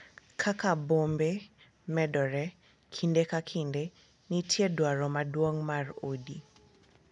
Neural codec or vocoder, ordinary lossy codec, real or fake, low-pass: none; none; real; 10.8 kHz